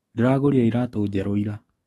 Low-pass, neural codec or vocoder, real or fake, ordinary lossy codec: 19.8 kHz; autoencoder, 48 kHz, 128 numbers a frame, DAC-VAE, trained on Japanese speech; fake; AAC, 32 kbps